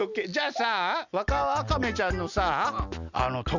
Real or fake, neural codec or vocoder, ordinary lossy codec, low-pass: real; none; none; 7.2 kHz